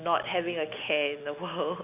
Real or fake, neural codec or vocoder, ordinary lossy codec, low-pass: real; none; none; 3.6 kHz